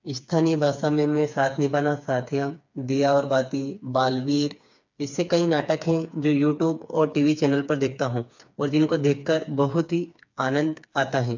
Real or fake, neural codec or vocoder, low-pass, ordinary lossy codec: fake; codec, 16 kHz, 4 kbps, FreqCodec, smaller model; 7.2 kHz; MP3, 64 kbps